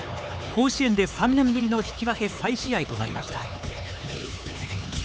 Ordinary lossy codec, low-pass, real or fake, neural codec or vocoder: none; none; fake; codec, 16 kHz, 4 kbps, X-Codec, HuBERT features, trained on LibriSpeech